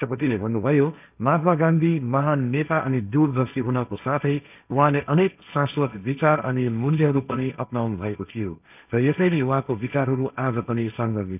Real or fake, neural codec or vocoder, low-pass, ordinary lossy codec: fake; codec, 16 kHz, 1.1 kbps, Voila-Tokenizer; 3.6 kHz; none